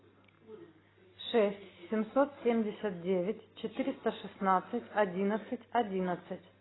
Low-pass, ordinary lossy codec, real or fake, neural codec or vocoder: 7.2 kHz; AAC, 16 kbps; real; none